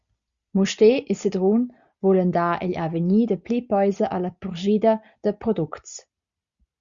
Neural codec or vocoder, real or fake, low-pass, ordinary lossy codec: none; real; 7.2 kHz; Opus, 64 kbps